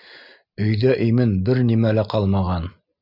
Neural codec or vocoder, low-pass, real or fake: none; 5.4 kHz; real